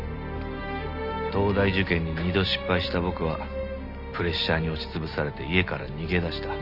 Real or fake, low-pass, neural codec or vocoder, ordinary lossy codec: real; 5.4 kHz; none; none